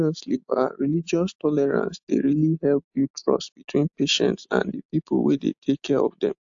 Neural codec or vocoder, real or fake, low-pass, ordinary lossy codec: none; real; 7.2 kHz; AAC, 64 kbps